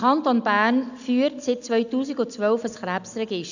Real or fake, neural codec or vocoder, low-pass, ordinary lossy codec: real; none; 7.2 kHz; none